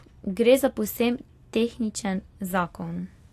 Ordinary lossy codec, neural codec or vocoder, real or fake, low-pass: AAC, 64 kbps; none; real; 14.4 kHz